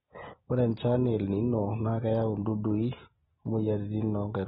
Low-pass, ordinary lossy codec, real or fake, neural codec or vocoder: 9.9 kHz; AAC, 16 kbps; real; none